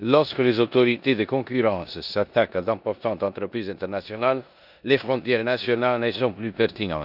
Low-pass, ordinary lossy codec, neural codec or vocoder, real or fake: 5.4 kHz; none; codec, 16 kHz in and 24 kHz out, 0.9 kbps, LongCat-Audio-Codec, four codebook decoder; fake